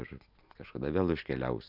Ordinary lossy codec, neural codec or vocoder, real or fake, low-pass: Opus, 64 kbps; none; real; 5.4 kHz